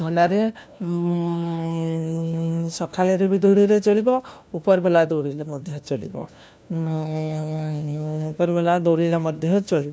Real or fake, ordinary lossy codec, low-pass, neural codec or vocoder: fake; none; none; codec, 16 kHz, 1 kbps, FunCodec, trained on LibriTTS, 50 frames a second